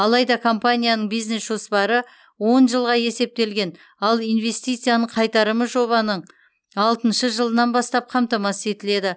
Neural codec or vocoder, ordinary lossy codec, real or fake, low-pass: none; none; real; none